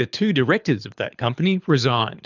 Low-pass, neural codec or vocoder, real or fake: 7.2 kHz; codec, 24 kHz, 6 kbps, HILCodec; fake